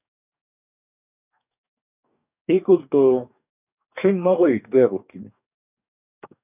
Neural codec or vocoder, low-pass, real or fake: codec, 44.1 kHz, 2.6 kbps, DAC; 3.6 kHz; fake